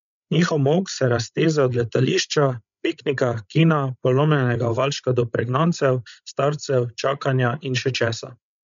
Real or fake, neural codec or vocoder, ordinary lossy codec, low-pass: fake; codec, 16 kHz, 16 kbps, FreqCodec, larger model; MP3, 64 kbps; 7.2 kHz